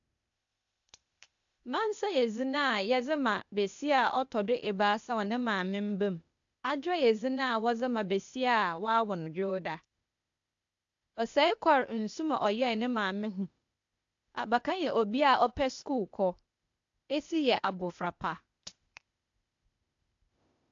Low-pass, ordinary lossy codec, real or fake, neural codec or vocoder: 7.2 kHz; AAC, 64 kbps; fake; codec, 16 kHz, 0.8 kbps, ZipCodec